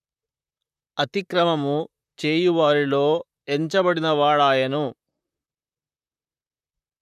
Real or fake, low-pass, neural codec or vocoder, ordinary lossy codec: fake; 14.4 kHz; vocoder, 44.1 kHz, 128 mel bands, Pupu-Vocoder; none